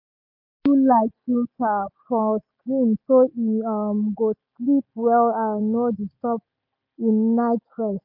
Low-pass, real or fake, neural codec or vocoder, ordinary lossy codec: 5.4 kHz; real; none; MP3, 48 kbps